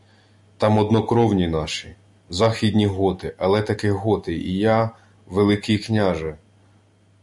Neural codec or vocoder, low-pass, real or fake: none; 10.8 kHz; real